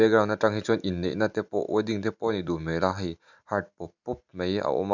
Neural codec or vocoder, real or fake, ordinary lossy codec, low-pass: none; real; none; 7.2 kHz